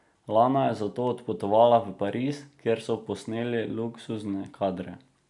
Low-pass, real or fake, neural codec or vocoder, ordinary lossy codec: 10.8 kHz; real; none; none